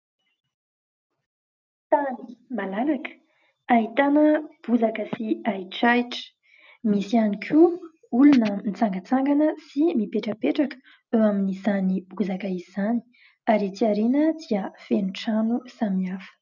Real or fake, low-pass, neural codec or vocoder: real; 7.2 kHz; none